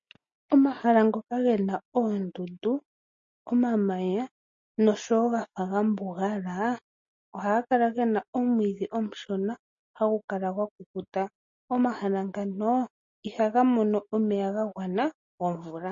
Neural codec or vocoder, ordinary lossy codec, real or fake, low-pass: none; MP3, 32 kbps; real; 7.2 kHz